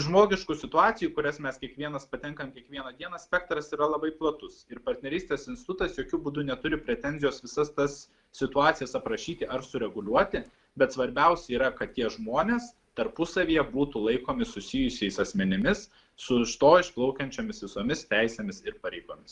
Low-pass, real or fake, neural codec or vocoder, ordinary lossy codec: 10.8 kHz; real; none; Opus, 32 kbps